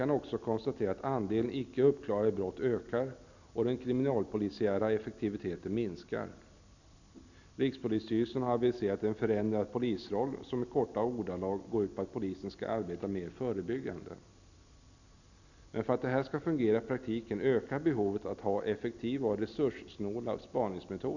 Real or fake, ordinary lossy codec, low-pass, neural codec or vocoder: real; none; 7.2 kHz; none